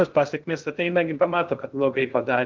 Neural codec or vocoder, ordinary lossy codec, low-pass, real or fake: codec, 16 kHz in and 24 kHz out, 0.6 kbps, FocalCodec, streaming, 2048 codes; Opus, 32 kbps; 7.2 kHz; fake